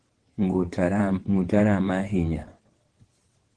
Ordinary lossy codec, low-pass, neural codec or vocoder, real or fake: Opus, 16 kbps; 9.9 kHz; vocoder, 22.05 kHz, 80 mel bands, WaveNeXt; fake